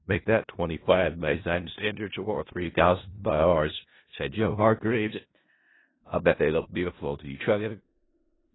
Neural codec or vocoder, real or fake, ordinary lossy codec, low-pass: codec, 16 kHz in and 24 kHz out, 0.4 kbps, LongCat-Audio-Codec, four codebook decoder; fake; AAC, 16 kbps; 7.2 kHz